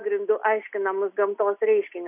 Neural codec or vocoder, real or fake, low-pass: none; real; 3.6 kHz